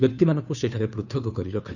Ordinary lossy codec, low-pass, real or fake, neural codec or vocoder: none; 7.2 kHz; fake; codec, 16 kHz, 2 kbps, FunCodec, trained on Chinese and English, 25 frames a second